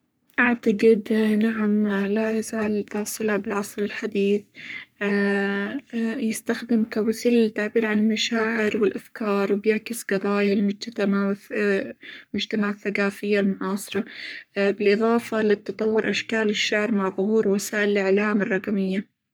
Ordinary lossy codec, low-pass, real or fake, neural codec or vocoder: none; none; fake; codec, 44.1 kHz, 3.4 kbps, Pupu-Codec